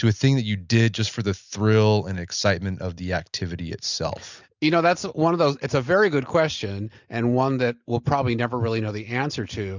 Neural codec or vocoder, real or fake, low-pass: none; real; 7.2 kHz